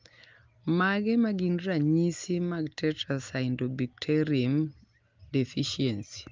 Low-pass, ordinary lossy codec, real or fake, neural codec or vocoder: 7.2 kHz; Opus, 32 kbps; real; none